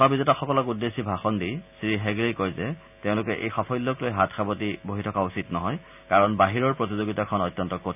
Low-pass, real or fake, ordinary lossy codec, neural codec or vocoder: 3.6 kHz; real; none; none